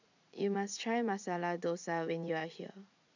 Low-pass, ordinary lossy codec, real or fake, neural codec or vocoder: 7.2 kHz; none; fake; vocoder, 44.1 kHz, 80 mel bands, Vocos